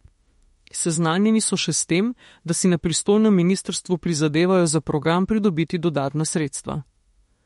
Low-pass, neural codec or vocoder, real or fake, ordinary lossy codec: 19.8 kHz; autoencoder, 48 kHz, 32 numbers a frame, DAC-VAE, trained on Japanese speech; fake; MP3, 48 kbps